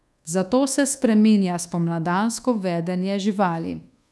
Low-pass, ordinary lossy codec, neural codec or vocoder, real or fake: none; none; codec, 24 kHz, 1.2 kbps, DualCodec; fake